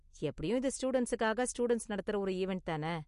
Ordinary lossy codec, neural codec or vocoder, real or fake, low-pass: MP3, 48 kbps; none; real; 10.8 kHz